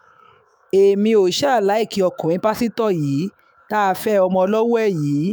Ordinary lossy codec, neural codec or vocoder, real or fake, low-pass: none; autoencoder, 48 kHz, 128 numbers a frame, DAC-VAE, trained on Japanese speech; fake; none